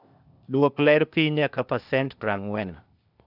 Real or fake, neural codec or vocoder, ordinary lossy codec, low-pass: fake; codec, 16 kHz, 0.8 kbps, ZipCodec; none; 5.4 kHz